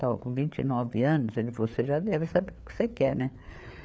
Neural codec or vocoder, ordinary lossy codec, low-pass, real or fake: codec, 16 kHz, 4 kbps, FreqCodec, larger model; none; none; fake